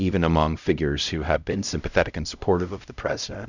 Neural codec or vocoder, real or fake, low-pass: codec, 16 kHz, 0.5 kbps, X-Codec, HuBERT features, trained on LibriSpeech; fake; 7.2 kHz